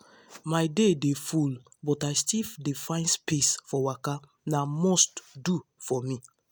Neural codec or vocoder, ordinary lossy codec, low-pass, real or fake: none; none; none; real